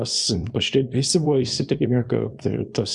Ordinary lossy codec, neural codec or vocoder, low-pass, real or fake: Opus, 64 kbps; codec, 24 kHz, 0.9 kbps, WavTokenizer, small release; 10.8 kHz; fake